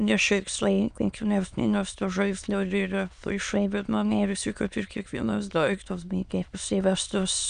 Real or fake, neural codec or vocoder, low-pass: fake; autoencoder, 22.05 kHz, a latent of 192 numbers a frame, VITS, trained on many speakers; 9.9 kHz